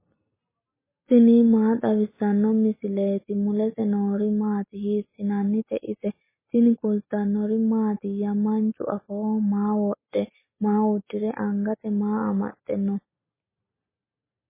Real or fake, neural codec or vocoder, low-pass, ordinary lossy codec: real; none; 3.6 kHz; MP3, 16 kbps